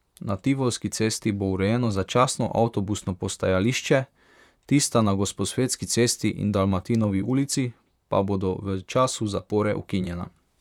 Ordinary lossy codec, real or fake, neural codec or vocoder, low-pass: none; fake; vocoder, 44.1 kHz, 128 mel bands, Pupu-Vocoder; 19.8 kHz